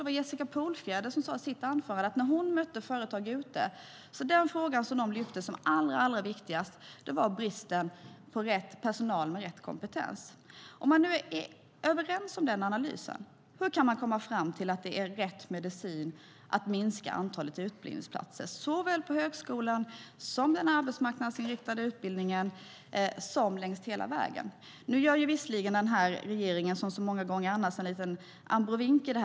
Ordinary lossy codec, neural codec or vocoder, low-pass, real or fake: none; none; none; real